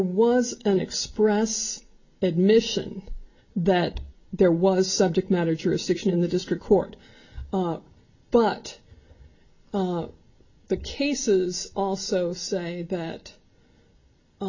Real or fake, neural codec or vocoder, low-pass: real; none; 7.2 kHz